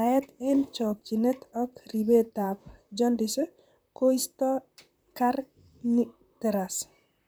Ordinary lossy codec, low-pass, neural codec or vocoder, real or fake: none; none; vocoder, 44.1 kHz, 128 mel bands, Pupu-Vocoder; fake